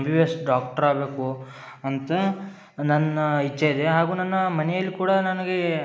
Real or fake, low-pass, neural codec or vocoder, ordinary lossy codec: real; none; none; none